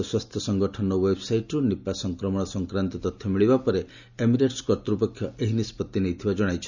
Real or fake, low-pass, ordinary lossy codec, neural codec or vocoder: real; 7.2 kHz; none; none